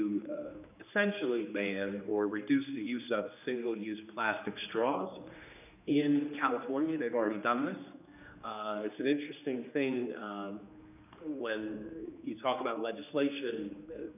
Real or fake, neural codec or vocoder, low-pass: fake; codec, 16 kHz, 2 kbps, X-Codec, HuBERT features, trained on general audio; 3.6 kHz